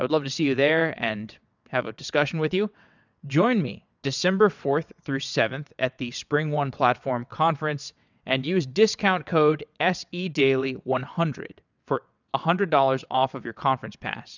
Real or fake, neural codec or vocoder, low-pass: fake; vocoder, 22.05 kHz, 80 mel bands, WaveNeXt; 7.2 kHz